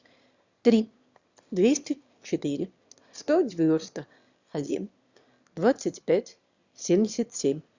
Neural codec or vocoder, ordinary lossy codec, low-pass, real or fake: autoencoder, 22.05 kHz, a latent of 192 numbers a frame, VITS, trained on one speaker; Opus, 64 kbps; 7.2 kHz; fake